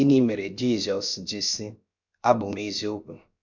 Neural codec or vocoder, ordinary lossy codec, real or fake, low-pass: codec, 16 kHz, about 1 kbps, DyCAST, with the encoder's durations; none; fake; 7.2 kHz